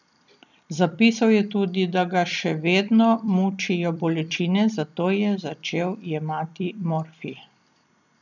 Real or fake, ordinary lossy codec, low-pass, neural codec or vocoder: fake; none; 7.2 kHz; vocoder, 44.1 kHz, 128 mel bands every 256 samples, BigVGAN v2